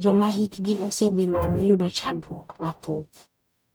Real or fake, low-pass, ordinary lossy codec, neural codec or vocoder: fake; none; none; codec, 44.1 kHz, 0.9 kbps, DAC